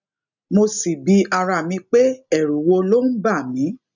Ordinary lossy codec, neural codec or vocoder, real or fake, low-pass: none; none; real; 7.2 kHz